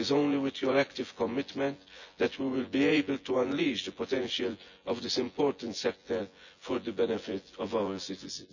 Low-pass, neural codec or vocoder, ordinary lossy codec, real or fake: 7.2 kHz; vocoder, 24 kHz, 100 mel bands, Vocos; none; fake